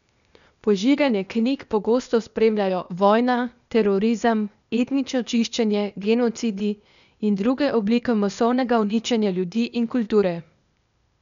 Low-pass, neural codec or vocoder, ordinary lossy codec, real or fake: 7.2 kHz; codec, 16 kHz, 0.8 kbps, ZipCodec; none; fake